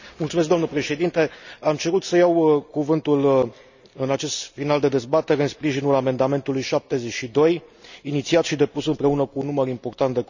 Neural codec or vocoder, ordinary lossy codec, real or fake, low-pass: none; none; real; 7.2 kHz